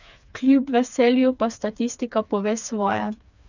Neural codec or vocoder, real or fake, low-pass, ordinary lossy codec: codec, 16 kHz, 4 kbps, FreqCodec, smaller model; fake; 7.2 kHz; none